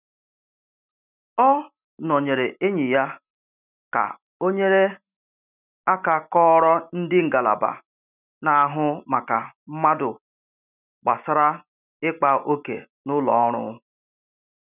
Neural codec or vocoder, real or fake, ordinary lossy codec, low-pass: none; real; none; 3.6 kHz